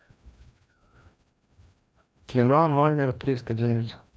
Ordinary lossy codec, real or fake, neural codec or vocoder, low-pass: none; fake; codec, 16 kHz, 1 kbps, FreqCodec, larger model; none